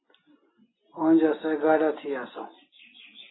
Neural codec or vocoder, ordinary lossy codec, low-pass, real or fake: none; AAC, 16 kbps; 7.2 kHz; real